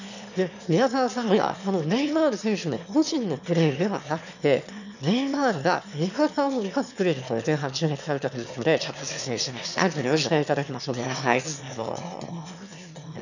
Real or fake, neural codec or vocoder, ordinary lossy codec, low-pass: fake; autoencoder, 22.05 kHz, a latent of 192 numbers a frame, VITS, trained on one speaker; none; 7.2 kHz